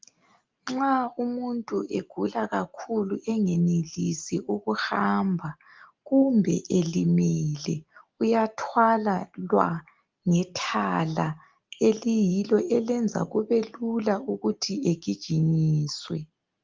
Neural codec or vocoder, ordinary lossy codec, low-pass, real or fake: none; Opus, 32 kbps; 7.2 kHz; real